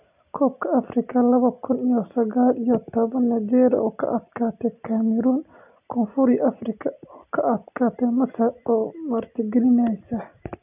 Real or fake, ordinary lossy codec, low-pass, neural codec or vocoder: real; none; 3.6 kHz; none